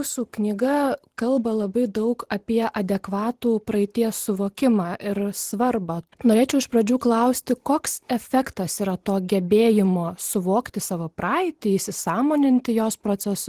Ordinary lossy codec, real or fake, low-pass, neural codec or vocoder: Opus, 16 kbps; fake; 14.4 kHz; vocoder, 44.1 kHz, 128 mel bands every 512 samples, BigVGAN v2